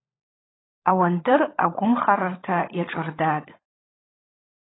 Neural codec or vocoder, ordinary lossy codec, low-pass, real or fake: codec, 16 kHz, 16 kbps, FunCodec, trained on LibriTTS, 50 frames a second; AAC, 16 kbps; 7.2 kHz; fake